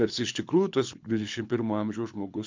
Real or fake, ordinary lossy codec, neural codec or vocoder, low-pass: fake; MP3, 64 kbps; codec, 24 kHz, 6 kbps, HILCodec; 7.2 kHz